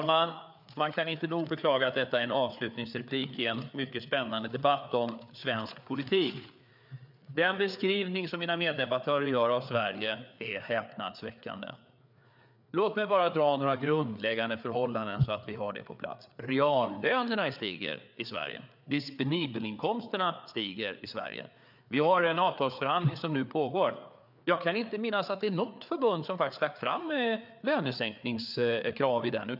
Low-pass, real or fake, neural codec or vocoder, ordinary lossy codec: 5.4 kHz; fake; codec, 16 kHz, 4 kbps, FreqCodec, larger model; none